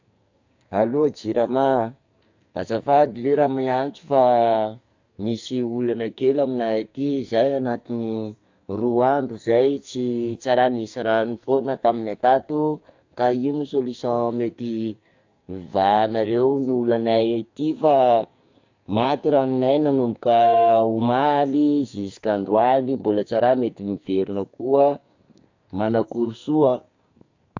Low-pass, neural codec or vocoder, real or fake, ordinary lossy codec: 7.2 kHz; codec, 44.1 kHz, 2.6 kbps, SNAC; fake; AAC, 48 kbps